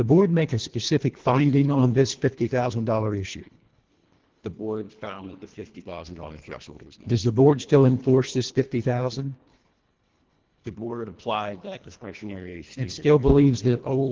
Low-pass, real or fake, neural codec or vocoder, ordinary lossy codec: 7.2 kHz; fake; codec, 24 kHz, 1.5 kbps, HILCodec; Opus, 16 kbps